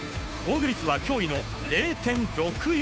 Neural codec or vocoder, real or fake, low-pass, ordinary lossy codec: codec, 16 kHz, 2 kbps, FunCodec, trained on Chinese and English, 25 frames a second; fake; none; none